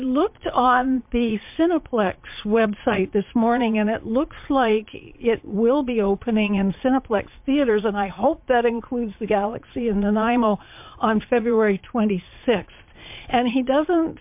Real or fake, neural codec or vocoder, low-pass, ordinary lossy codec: fake; vocoder, 22.05 kHz, 80 mel bands, Vocos; 3.6 kHz; MP3, 32 kbps